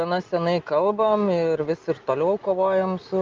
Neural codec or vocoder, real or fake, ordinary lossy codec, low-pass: none; real; Opus, 32 kbps; 7.2 kHz